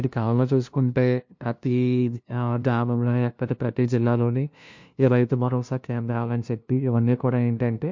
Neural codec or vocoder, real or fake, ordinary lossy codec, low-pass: codec, 16 kHz, 0.5 kbps, FunCodec, trained on LibriTTS, 25 frames a second; fake; MP3, 48 kbps; 7.2 kHz